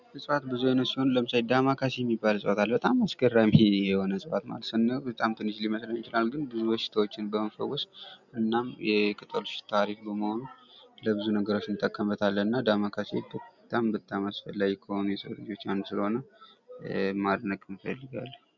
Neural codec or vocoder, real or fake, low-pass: none; real; 7.2 kHz